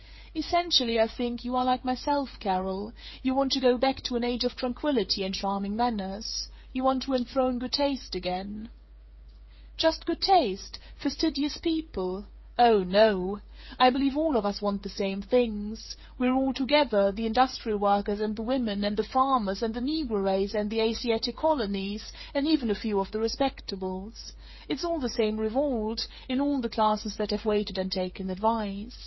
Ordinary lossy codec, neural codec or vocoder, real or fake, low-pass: MP3, 24 kbps; codec, 16 kHz, 8 kbps, FreqCodec, smaller model; fake; 7.2 kHz